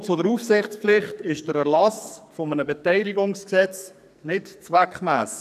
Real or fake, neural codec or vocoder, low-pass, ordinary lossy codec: fake; codec, 44.1 kHz, 2.6 kbps, SNAC; 14.4 kHz; none